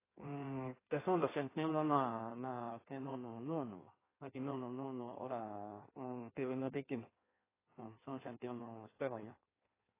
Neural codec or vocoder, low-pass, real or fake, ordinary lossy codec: codec, 16 kHz in and 24 kHz out, 1.1 kbps, FireRedTTS-2 codec; 3.6 kHz; fake; AAC, 16 kbps